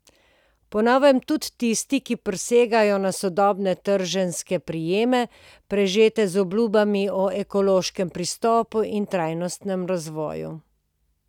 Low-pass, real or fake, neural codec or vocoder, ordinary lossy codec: 19.8 kHz; real; none; none